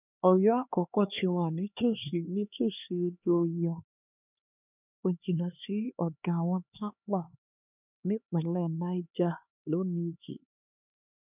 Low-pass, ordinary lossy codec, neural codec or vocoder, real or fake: 3.6 kHz; none; codec, 16 kHz, 2 kbps, X-Codec, HuBERT features, trained on LibriSpeech; fake